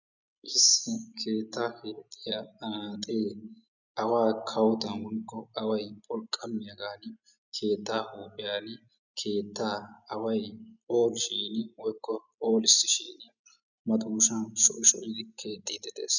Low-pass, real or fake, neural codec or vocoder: 7.2 kHz; real; none